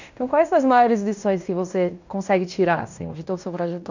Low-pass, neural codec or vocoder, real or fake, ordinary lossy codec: 7.2 kHz; codec, 16 kHz in and 24 kHz out, 0.9 kbps, LongCat-Audio-Codec, fine tuned four codebook decoder; fake; none